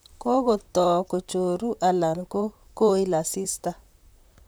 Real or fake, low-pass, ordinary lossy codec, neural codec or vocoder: fake; none; none; vocoder, 44.1 kHz, 128 mel bands, Pupu-Vocoder